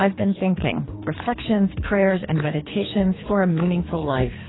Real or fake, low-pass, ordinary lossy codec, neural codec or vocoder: fake; 7.2 kHz; AAC, 16 kbps; codec, 24 kHz, 3 kbps, HILCodec